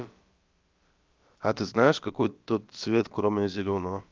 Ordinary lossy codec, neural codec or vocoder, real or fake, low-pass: Opus, 24 kbps; codec, 16 kHz, about 1 kbps, DyCAST, with the encoder's durations; fake; 7.2 kHz